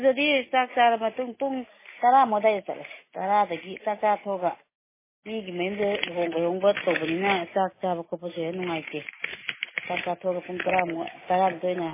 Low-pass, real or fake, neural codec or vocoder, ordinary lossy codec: 3.6 kHz; real; none; MP3, 16 kbps